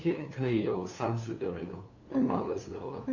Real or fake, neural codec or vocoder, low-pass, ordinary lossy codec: fake; codec, 16 kHz, 2 kbps, FunCodec, trained on LibriTTS, 25 frames a second; 7.2 kHz; none